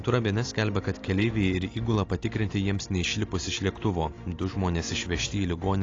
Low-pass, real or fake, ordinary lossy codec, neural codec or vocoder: 7.2 kHz; real; AAC, 32 kbps; none